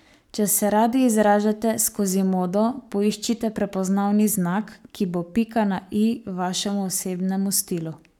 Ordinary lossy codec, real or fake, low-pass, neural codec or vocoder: none; fake; 19.8 kHz; codec, 44.1 kHz, 7.8 kbps, Pupu-Codec